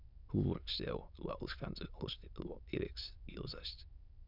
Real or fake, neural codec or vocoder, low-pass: fake; autoencoder, 22.05 kHz, a latent of 192 numbers a frame, VITS, trained on many speakers; 5.4 kHz